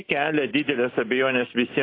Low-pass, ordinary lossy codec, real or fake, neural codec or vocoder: 5.4 kHz; AAC, 24 kbps; real; none